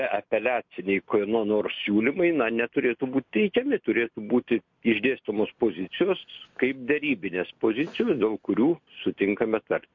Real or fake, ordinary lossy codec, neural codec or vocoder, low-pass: real; MP3, 48 kbps; none; 7.2 kHz